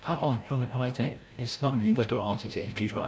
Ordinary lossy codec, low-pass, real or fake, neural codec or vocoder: none; none; fake; codec, 16 kHz, 0.5 kbps, FreqCodec, larger model